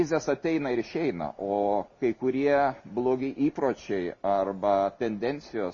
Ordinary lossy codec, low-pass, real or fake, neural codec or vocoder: MP3, 32 kbps; 7.2 kHz; real; none